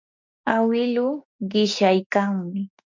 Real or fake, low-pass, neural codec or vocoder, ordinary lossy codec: real; 7.2 kHz; none; MP3, 64 kbps